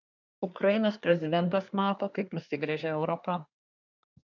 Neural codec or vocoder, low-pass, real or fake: codec, 24 kHz, 1 kbps, SNAC; 7.2 kHz; fake